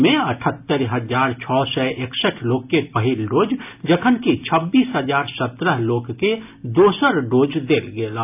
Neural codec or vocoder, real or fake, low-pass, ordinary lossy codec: none; real; 3.6 kHz; AAC, 32 kbps